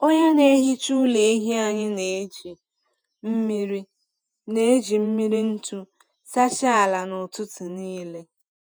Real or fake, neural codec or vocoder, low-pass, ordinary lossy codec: fake; vocoder, 48 kHz, 128 mel bands, Vocos; none; none